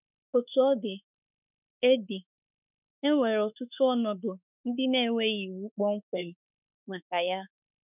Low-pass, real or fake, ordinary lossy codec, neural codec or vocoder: 3.6 kHz; fake; none; autoencoder, 48 kHz, 32 numbers a frame, DAC-VAE, trained on Japanese speech